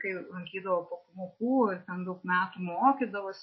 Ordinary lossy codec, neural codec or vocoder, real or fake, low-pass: MP3, 24 kbps; none; real; 7.2 kHz